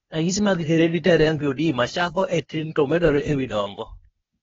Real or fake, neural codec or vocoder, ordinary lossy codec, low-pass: fake; codec, 16 kHz, 0.8 kbps, ZipCodec; AAC, 24 kbps; 7.2 kHz